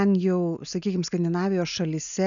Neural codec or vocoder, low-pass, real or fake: none; 7.2 kHz; real